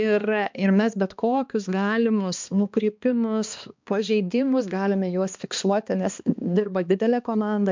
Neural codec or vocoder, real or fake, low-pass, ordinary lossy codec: codec, 16 kHz, 2 kbps, X-Codec, HuBERT features, trained on balanced general audio; fake; 7.2 kHz; MP3, 64 kbps